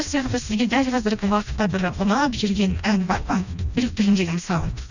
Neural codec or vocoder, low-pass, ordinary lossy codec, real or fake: codec, 16 kHz, 1 kbps, FreqCodec, smaller model; 7.2 kHz; none; fake